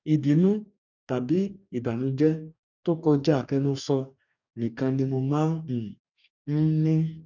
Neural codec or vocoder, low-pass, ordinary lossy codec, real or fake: codec, 44.1 kHz, 2.6 kbps, DAC; 7.2 kHz; none; fake